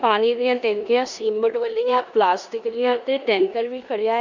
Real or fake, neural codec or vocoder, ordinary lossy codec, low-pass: fake; codec, 16 kHz in and 24 kHz out, 0.9 kbps, LongCat-Audio-Codec, four codebook decoder; none; 7.2 kHz